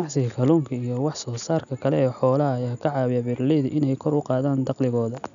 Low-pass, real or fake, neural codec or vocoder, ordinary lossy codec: 7.2 kHz; real; none; none